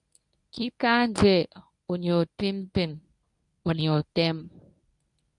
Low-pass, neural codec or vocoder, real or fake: 10.8 kHz; codec, 24 kHz, 0.9 kbps, WavTokenizer, medium speech release version 1; fake